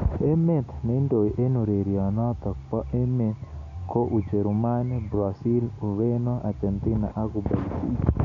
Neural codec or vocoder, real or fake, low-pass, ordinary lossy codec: none; real; 7.2 kHz; none